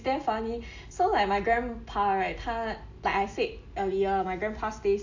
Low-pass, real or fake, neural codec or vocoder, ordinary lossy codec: 7.2 kHz; real; none; AAC, 48 kbps